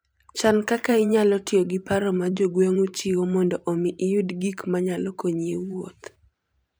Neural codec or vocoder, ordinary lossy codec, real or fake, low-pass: vocoder, 44.1 kHz, 128 mel bands every 512 samples, BigVGAN v2; none; fake; none